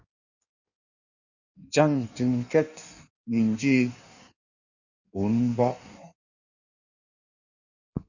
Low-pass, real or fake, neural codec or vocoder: 7.2 kHz; fake; codec, 16 kHz in and 24 kHz out, 1.1 kbps, FireRedTTS-2 codec